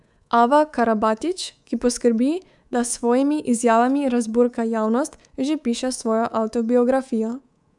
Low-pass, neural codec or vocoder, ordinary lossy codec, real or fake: 10.8 kHz; codec, 24 kHz, 3.1 kbps, DualCodec; none; fake